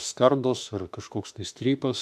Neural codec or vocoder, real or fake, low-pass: autoencoder, 48 kHz, 32 numbers a frame, DAC-VAE, trained on Japanese speech; fake; 14.4 kHz